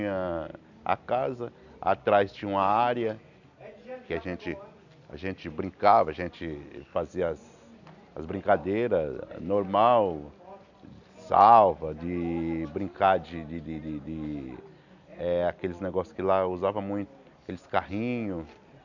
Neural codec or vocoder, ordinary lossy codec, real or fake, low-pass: none; none; real; 7.2 kHz